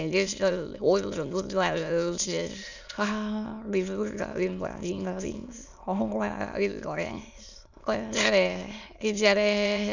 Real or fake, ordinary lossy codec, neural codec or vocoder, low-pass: fake; none; autoencoder, 22.05 kHz, a latent of 192 numbers a frame, VITS, trained on many speakers; 7.2 kHz